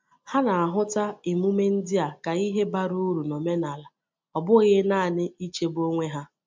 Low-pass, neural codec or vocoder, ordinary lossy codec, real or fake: 7.2 kHz; none; MP3, 64 kbps; real